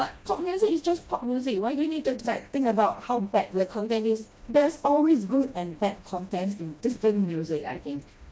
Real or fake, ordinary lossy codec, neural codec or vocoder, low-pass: fake; none; codec, 16 kHz, 1 kbps, FreqCodec, smaller model; none